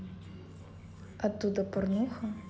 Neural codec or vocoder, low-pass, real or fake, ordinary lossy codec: none; none; real; none